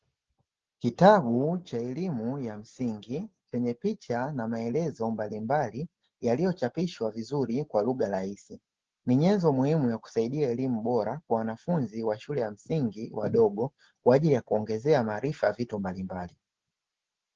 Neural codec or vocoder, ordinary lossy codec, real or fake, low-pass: none; Opus, 16 kbps; real; 7.2 kHz